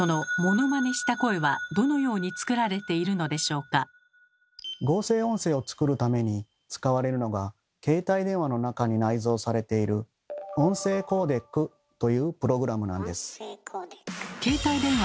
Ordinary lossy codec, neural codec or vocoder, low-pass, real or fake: none; none; none; real